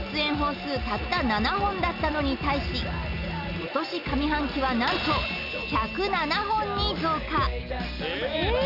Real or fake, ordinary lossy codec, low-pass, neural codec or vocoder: real; none; 5.4 kHz; none